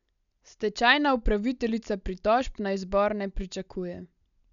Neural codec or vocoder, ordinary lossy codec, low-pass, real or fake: none; none; 7.2 kHz; real